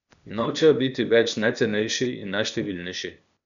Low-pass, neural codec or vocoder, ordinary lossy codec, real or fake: 7.2 kHz; codec, 16 kHz, 0.8 kbps, ZipCodec; none; fake